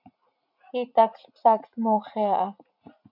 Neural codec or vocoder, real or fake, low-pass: vocoder, 44.1 kHz, 80 mel bands, Vocos; fake; 5.4 kHz